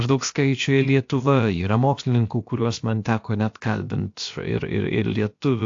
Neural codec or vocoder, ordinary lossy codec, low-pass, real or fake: codec, 16 kHz, about 1 kbps, DyCAST, with the encoder's durations; AAC, 48 kbps; 7.2 kHz; fake